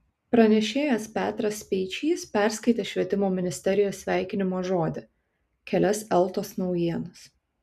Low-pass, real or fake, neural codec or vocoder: 14.4 kHz; real; none